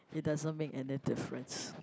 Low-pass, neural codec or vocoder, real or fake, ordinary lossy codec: none; none; real; none